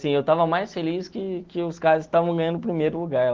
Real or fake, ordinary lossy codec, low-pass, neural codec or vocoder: real; Opus, 16 kbps; 7.2 kHz; none